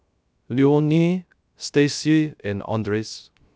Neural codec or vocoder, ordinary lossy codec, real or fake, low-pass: codec, 16 kHz, 0.3 kbps, FocalCodec; none; fake; none